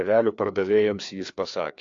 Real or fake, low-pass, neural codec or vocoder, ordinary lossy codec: fake; 7.2 kHz; codec, 16 kHz, 2 kbps, FreqCodec, larger model; AAC, 48 kbps